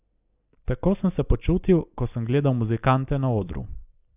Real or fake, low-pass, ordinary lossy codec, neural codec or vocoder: real; 3.6 kHz; AAC, 32 kbps; none